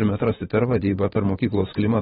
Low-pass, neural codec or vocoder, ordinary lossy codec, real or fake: 19.8 kHz; vocoder, 44.1 kHz, 128 mel bands every 512 samples, BigVGAN v2; AAC, 16 kbps; fake